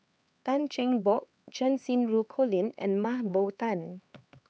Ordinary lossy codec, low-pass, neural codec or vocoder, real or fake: none; none; codec, 16 kHz, 4 kbps, X-Codec, HuBERT features, trained on LibriSpeech; fake